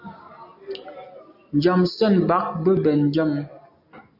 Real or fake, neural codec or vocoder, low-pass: real; none; 5.4 kHz